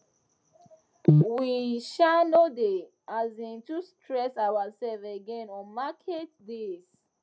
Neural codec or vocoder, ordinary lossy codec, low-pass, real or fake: none; none; none; real